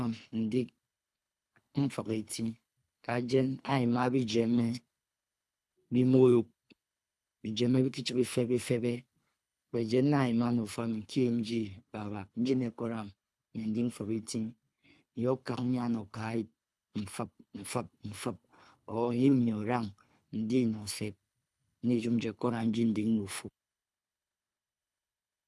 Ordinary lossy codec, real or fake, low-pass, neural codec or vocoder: none; fake; none; codec, 24 kHz, 3 kbps, HILCodec